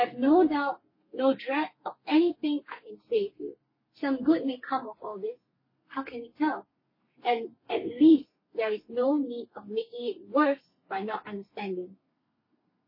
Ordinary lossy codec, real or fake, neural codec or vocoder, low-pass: MP3, 24 kbps; fake; codec, 32 kHz, 1.9 kbps, SNAC; 5.4 kHz